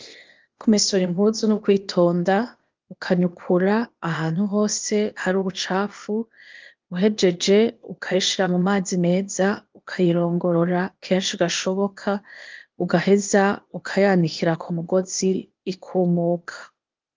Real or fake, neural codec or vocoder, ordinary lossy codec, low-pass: fake; codec, 16 kHz, 0.8 kbps, ZipCodec; Opus, 32 kbps; 7.2 kHz